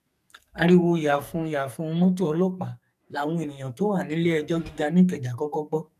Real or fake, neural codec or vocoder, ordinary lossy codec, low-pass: fake; codec, 44.1 kHz, 2.6 kbps, SNAC; none; 14.4 kHz